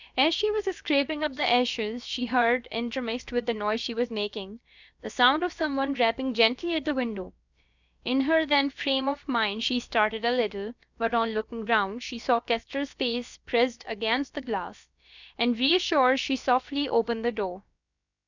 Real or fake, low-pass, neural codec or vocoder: fake; 7.2 kHz; codec, 16 kHz, about 1 kbps, DyCAST, with the encoder's durations